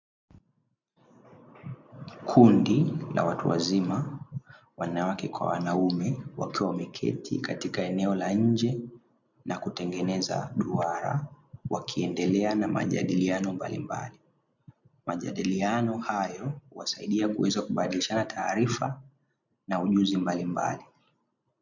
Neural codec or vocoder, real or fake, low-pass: none; real; 7.2 kHz